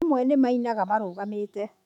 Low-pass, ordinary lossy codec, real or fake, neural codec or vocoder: 19.8 kHz; none; fake; autoencoder, 48 kHz, 128 numbers a frame, DAC-VAE, trained on Japanese speech